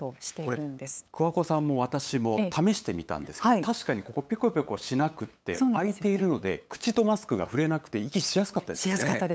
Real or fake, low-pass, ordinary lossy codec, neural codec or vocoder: fake; none; none; codec, 16 kHz, 8 kbps, FunCodec, trained on LibriTTS, 25 frames a second